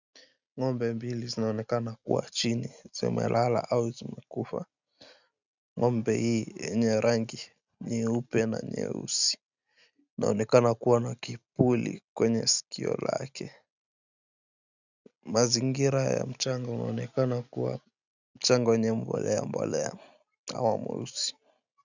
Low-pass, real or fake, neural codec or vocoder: 7.2 kHz; real; none